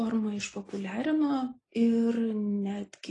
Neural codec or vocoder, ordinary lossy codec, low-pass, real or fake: none; AAC, 32 kbps; 10.8 kHz; real